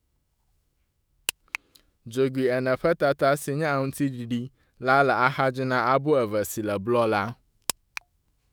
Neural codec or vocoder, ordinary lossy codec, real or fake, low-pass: autoencoder, 48 kHz, 128 numbers a frame, DAC-VAE, trained on Japanese speech; none; fake; none